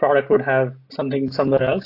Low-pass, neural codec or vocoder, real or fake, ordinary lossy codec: 5.4 kHz; none; real; AAC, 32 kbps